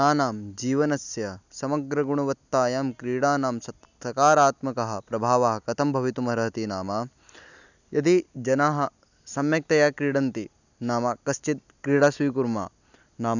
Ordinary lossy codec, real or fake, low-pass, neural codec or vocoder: none; real; 7.2 kHz; none